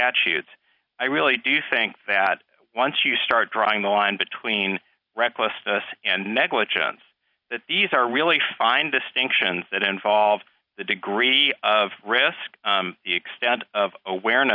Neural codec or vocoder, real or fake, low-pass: none; real; 5.4 kHz